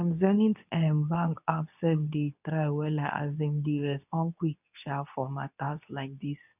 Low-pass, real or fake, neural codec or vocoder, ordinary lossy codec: 3.6 kHz; fake; codec, 24 kHz, 0.9 kbps, WavTokenizer, medium speech release version 2; none